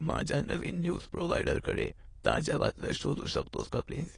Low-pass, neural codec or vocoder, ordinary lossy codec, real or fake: 9.9 kHz; autoencoder, 22.05 kHz, a latent of 192 numbers a frame, VITS, trained on many speakers; AAC, 32 kbps; fake